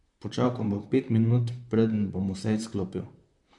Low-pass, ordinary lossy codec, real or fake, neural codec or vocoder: 10.8 kHz; MP3, 64 kbps; fake; vocoder, 44.1 kHz, 128 mel bands, Pupu-Vocoder